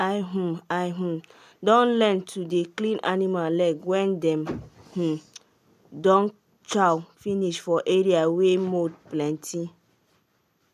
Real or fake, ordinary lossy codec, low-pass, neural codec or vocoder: real; none; 14.4 kHz; none